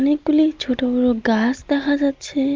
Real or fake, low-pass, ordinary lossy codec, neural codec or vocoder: real; 7.2 kHz; Opus, 32 kbps; none